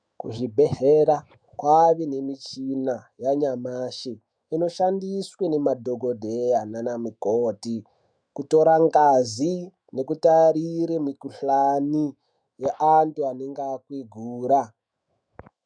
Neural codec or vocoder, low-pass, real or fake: autoencoder, 48 kHz, 128 numbers a frame, DAC-VAE, trained on Japanese speech; 9.9 kHz; fake